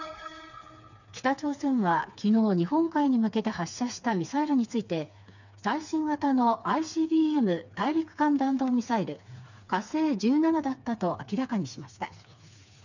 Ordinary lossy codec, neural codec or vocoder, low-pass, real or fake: none; codec, 16 kHz, 4 kbps, FreqCodec, smaller model; 7.2 kHz; fake